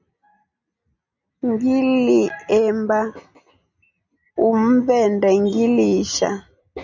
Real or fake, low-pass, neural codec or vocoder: real; 7.2 kHz; none